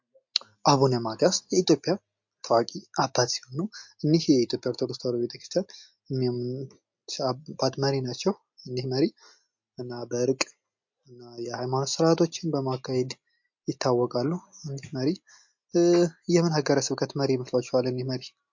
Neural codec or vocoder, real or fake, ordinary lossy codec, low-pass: none; real; MP3, 48 kbps; 7.2 kHz